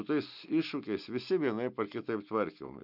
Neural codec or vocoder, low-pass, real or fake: none; 5.4 kHz; real